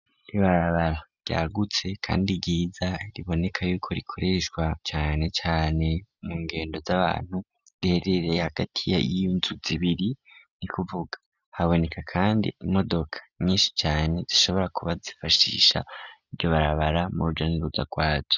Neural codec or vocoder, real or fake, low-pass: none; real; 7.2 kHz